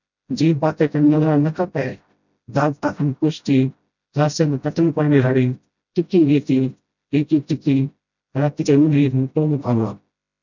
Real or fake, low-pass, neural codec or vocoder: fake; 7.2 kHz; codec, 16 kHz, 0.5 kbps, FreqCodec, smaller model